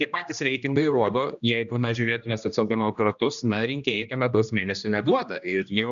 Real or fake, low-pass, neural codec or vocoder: fake; 7.2 kHz; codec, 16 kHz, 1 kbps, X-Codec, HuBERT features, trained on general audio